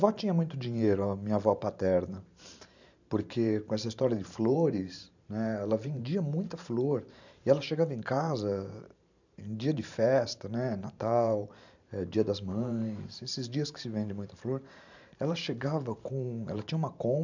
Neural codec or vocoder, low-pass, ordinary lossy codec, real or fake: vocoder, 44.1 kHz, 128 mel bands every 512 samples, BigVGAN v2; 7.2 kHz; none; fake